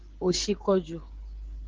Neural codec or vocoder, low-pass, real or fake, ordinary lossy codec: codec, 16 kHz, 16 kbps, FreqCodec, smaller model; 7.2 kHz; fake; Opus, 16 kbps